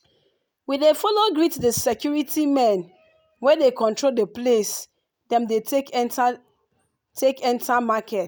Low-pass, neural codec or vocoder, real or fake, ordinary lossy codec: none; none; real; none